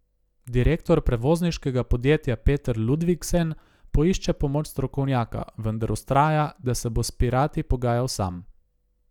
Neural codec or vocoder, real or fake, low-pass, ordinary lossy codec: none; real; 19.8 kHz; none